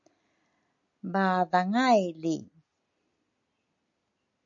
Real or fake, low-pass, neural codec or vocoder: real; 7.2 kHz; none